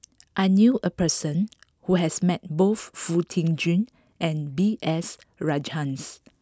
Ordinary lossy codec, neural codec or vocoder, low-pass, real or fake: none; none; none; real